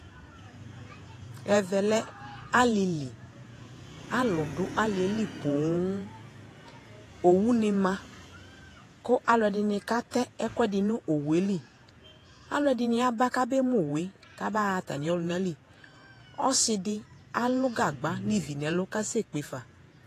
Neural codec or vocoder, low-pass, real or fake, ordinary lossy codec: vocoder, 48 kHz, 128 mel bands, Vocos; 14.4 kHz; fake; AAC, 48 kbps